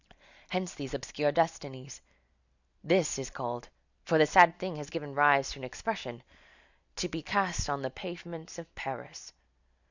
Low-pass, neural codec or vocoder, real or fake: 7.2 kHz; none; real